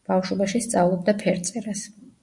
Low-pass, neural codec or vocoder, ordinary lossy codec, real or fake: 10.8 kHz; none; MP3, 96 kbps; real